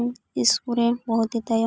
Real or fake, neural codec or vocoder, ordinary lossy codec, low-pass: real; none; none; none